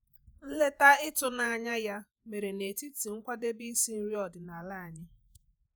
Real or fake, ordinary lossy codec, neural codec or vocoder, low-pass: fake; none; vocoder, 48 kHz, 128 mel bands, Vocos; none